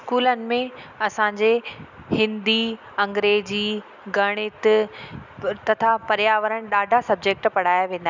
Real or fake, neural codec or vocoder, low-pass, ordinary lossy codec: real; none; 7.2 kHz; none